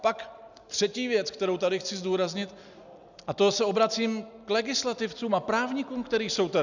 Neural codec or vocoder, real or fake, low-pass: none; real; 7.2 kHz